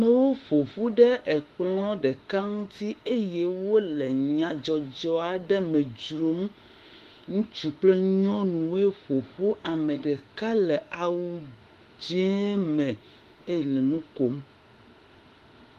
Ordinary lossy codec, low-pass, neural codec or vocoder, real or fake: Opus, 32 kbps; 14.4 kHz; autoencoder, 48 kHz, 32 numbers a frame, DAC-VAE, trained on Japanese speech; fake